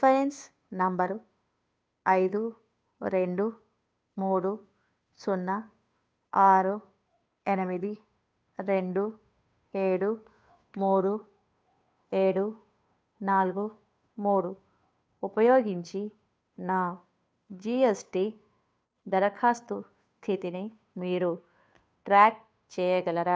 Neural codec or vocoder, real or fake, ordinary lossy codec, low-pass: codec, 16 kHz, 2 kbps, FunCodec, trained on Chinese and English, 25 frames a second; fake; none; none